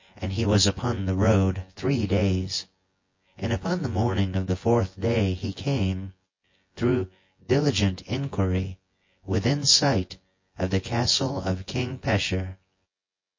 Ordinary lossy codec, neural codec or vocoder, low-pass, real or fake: MP3, 32 kbps; vocoder, 24 kHz, 100 mel bands, Vocos; 7.2 kHz; fake